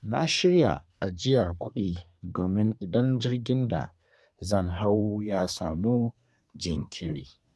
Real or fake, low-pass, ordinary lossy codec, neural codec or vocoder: fake; none; none; codec, 24 kHz, 1 kbps, SNAC